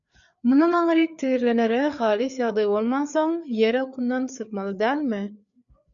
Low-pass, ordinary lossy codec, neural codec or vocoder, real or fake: 7.2 kHz; MP3, 96 kbps; codec, 16 kHz, 4 kbps, FreqCodec, larger model; fake